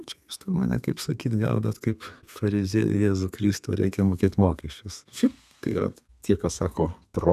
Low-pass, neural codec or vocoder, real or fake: 14.4 kHz; codec, 32 kHz, 1.9 kbps, SNAC; fake